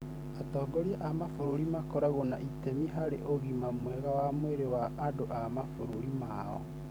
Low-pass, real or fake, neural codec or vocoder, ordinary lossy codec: none; fake; vocoder, 44.1 kHz, 128 mel bands every 512 samples, BigVGAN v2; none